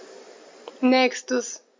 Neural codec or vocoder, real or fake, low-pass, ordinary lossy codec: none; real; 7.2 kHz; MP3, 48 kbps